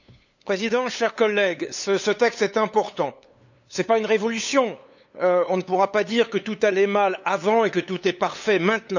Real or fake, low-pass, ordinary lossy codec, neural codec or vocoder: fake; 7.2 kHz; none; codec, 16 kHz, 8 kbps, FunCodec, trained on LibriTTS, 25 frames a second